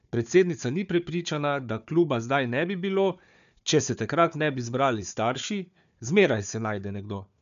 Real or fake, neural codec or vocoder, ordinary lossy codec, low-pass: fake; codec, 16 kHz, 4 kbps, FunCodec, trained on Chinese and English, 50 frames a second; none; 7.2 kHz